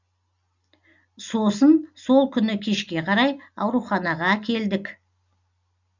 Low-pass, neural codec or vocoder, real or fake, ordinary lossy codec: 7.2 kHz; none; real; none